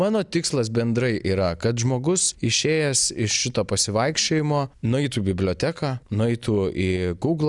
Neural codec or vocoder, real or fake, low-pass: none; real; 10.8 kHz